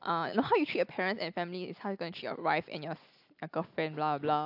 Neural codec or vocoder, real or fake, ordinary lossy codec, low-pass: none; real; AAC, 48 kbps; 5.4 kHz